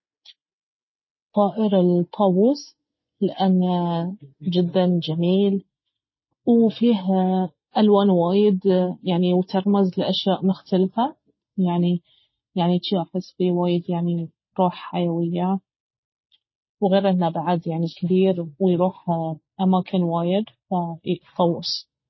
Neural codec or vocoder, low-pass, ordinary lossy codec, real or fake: none; 7.2 kHz; MP3, 24 kbps; real